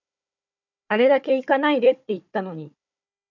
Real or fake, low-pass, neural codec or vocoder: fake; 7.2 kHz; codec, 16 kHz, 4 kbps, FunCodec, trained on Chinese and English, 50 frames a second